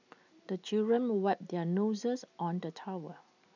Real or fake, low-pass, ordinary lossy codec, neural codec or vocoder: real; 7.2 kHz; none; none